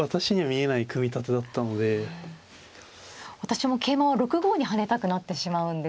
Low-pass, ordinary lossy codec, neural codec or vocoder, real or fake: none; none; none; real